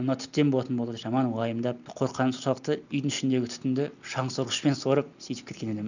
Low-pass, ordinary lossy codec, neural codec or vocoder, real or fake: 7.2 kHz; none; none; real